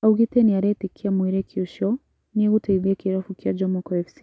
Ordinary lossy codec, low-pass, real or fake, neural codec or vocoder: none; none; real; none